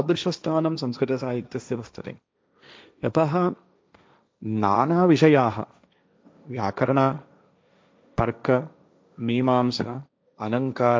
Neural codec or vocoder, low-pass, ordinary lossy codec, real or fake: codec, 16 kHz, 1.1 kbps, Voila-Tokenizer; none; none; fake